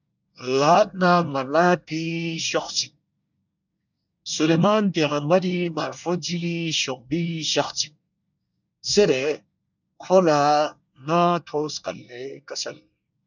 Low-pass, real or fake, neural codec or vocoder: 7.2 kHz; fake; codec, 24 kHz, 1 kbps, SNAC